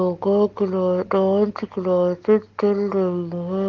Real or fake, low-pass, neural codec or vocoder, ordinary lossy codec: real; 7.2 kHz; none; Opus, 16 kbps